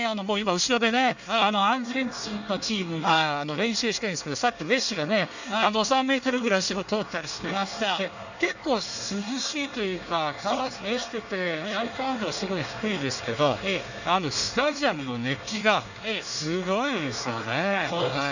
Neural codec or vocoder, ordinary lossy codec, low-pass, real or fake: codec, 24 kHz, 1 kbps, SNAC; none; 7.2 kHz; fake